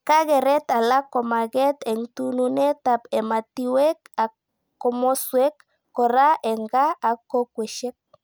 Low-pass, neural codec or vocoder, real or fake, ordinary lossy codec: none; none; real; none